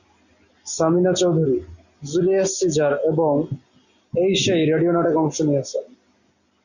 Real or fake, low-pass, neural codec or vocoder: real; 7.2 kHz; none